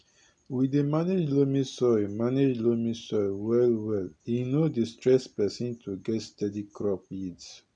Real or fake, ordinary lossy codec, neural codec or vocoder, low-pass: real; none; none; 9.9 kHz